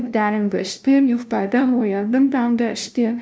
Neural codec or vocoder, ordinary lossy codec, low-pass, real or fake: codec, 16 kHz, 0.5 kbps, FunCodec, trained on LibriTTS, 25 frames a second; none; none; fake